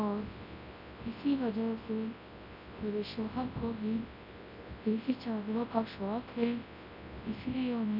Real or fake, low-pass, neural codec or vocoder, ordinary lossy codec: fake; 5.4 kHz; codec, 24 kHz, 0.9 kbps, WavTokenizer, large speech release; none